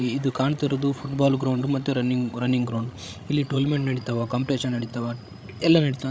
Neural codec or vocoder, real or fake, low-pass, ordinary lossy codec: codec, 16 kHz, 16 kbps, FreqCodec, larger model; fake; none; none